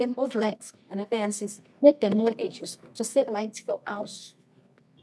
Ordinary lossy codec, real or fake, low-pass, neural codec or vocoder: none; fake; none; codec, 24 kHz, 0.9 kbps, WavTokenizer, medium music audio release